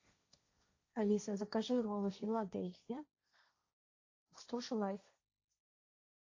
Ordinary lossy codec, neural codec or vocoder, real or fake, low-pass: AAC, 48 kbps; codec, 16 kHz, 1.1 kbps, Voila-Tokenizer; fake; 7.2 kHz